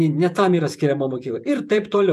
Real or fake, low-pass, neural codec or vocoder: fake; 14.4 kHz; vocoder, 48 kHz, 128 mel bands, Vocos